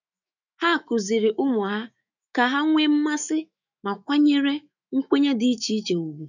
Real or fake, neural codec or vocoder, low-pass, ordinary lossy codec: fake; autoencoder, 48 kHz, 128 numbers a frame, DAC-VAE, trained on Japanese speech; 7.2 kHz; none